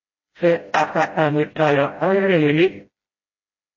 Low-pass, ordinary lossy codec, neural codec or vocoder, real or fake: 7.2 kHz; MP3, 32 kbps; codec, 16 kHz, 0.5 kbps, FreqCodec, smaller model; fake